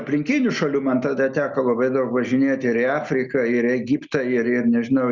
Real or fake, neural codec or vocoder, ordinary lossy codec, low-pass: real; none; Opus, 64 kbps; 7.2 kHz